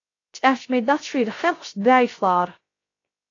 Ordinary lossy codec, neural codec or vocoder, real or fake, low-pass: AAC, 32 kbps; codec, 16 kHz, 0.3 kbps, FocalCodec; fake; 7.2 kHz